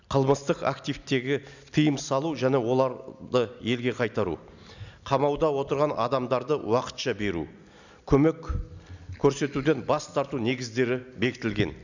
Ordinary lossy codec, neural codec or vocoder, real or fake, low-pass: none; none; real; 7.2 kHz